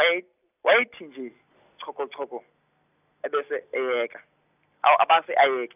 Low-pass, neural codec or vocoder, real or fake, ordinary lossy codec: 3.6 kHz; none; real; none